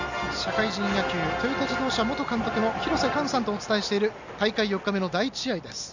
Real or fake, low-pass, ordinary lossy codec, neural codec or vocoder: real; 7.2 kHz; none; none